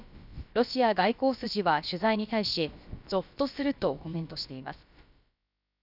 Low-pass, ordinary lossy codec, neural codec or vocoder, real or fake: 5.4 kHz; none; codec, 16 kHz, about 1 kbps, DyCAST, with the encoder's durations; fake